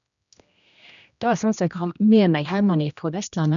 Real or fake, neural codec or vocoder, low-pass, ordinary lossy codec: fake; codec, 16 kHz, 1 kbps, X-Codec, HuBERT features, trained on general audio; 7.2 kHz; none